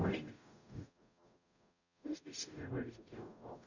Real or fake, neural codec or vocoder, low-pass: fake; codec, 44.1 kHz, 0.9 kbps, DAC; 7.2 kHz